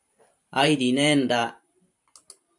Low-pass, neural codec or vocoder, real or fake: 10.8 kHz; vocoder, 44.1 kHz, 128 mel bands every 512 samples, BigVGAN v2; fake